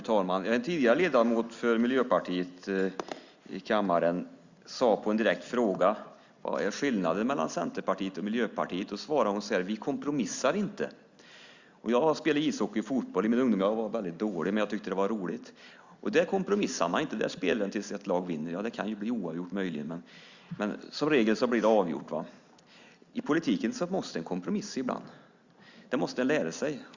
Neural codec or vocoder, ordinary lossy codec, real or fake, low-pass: none; Opus, 64 kbps; real; 7.2 kHz